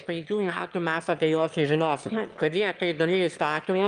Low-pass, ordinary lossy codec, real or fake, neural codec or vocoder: 9.9 kHz; Opus, 32 kbps; fake; autoencoder, 22.05 kHz, a latent of 192 numbers a frame, VITS, trained on one speaker